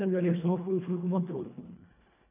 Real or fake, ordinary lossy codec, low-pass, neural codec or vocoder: fake; none; 3.6 kHz; codec, 24 kHz, 1.5 kbps, HILCodec